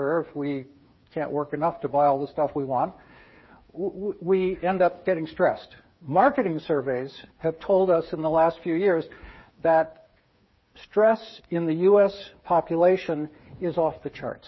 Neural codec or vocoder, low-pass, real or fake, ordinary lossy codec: codec, 16 kHz, 8 kbps, FreqCodec, smaller model; 7.2 kHz; fake; MP3, 24 kbps